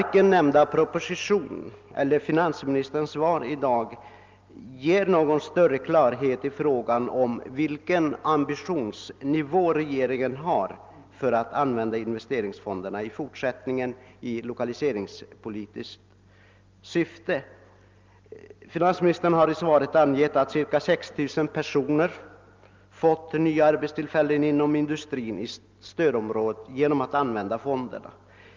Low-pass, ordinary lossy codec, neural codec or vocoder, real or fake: 7.2 kHz; Opus, 24 kbps; none; real